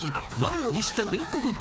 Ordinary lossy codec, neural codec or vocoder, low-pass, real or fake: none; codec, 16 kHz, 2 kbps, FreqCodec, larger model; none; fake